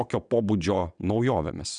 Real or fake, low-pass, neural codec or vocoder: fake; 9.9 kHz; vocoder, 22.05 kHz, 80 mel bands, WaveNeXt